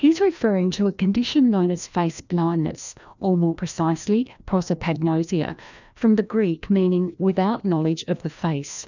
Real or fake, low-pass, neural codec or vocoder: fake; 7.2 kHz; codec, 16 kHz, 1 kbps, FreqCodec, larger model